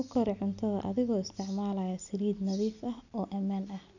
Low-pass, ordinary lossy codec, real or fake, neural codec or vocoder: 7.2 kHz; none; real; none